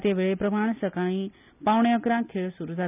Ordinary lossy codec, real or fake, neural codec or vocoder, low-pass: none; real; none; 3.6 kHz